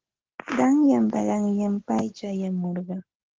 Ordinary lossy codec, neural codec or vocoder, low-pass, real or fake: Opus, 16 kbps; none; 7.2 kHz; real